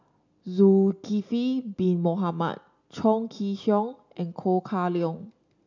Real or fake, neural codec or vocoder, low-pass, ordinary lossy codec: real; none; 7.2 kHz; none